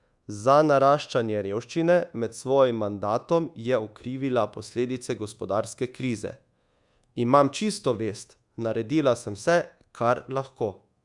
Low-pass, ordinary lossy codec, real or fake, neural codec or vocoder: 10.8 kHz; Opus, 64 kbps; fake; codec, 24 kHz, 1.2 kbps, DualCodec